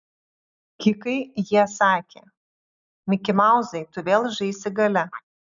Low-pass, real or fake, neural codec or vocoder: 7.2 kHz; real; none